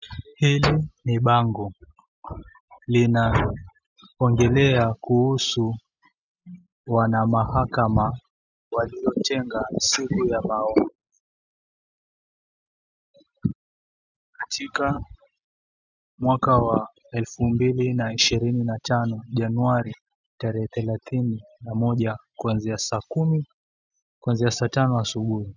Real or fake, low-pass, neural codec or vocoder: real; 7.2 kHz; none